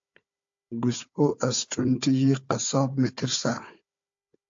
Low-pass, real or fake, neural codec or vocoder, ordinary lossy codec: 7.2 kHz; fake; codec, 16 kHz, 4 kbps, FunCodec, trained on Chinese and English, 50 frames a second; AAC, 64 kbps